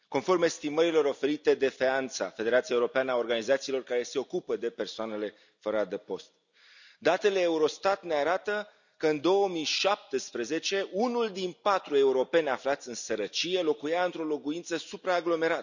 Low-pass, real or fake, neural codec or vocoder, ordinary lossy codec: 7.2 kHz; real; none; none